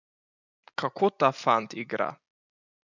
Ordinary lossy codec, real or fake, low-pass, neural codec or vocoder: none; real; 7.2 kHz; none